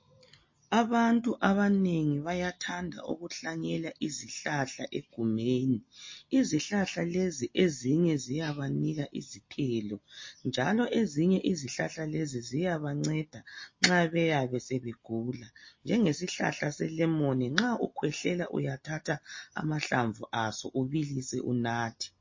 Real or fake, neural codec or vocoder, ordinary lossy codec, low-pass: real; none; MP3, 32 kbps; 7.2 kHz